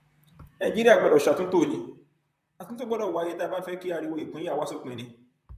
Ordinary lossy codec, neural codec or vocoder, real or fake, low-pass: none; vocoder, 44.1 kHz, 128 mel bands, Pupu-Vocoder; fake; 14.4 kHz